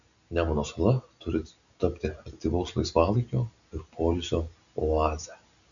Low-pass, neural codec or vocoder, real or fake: 7.2 kHz; none; real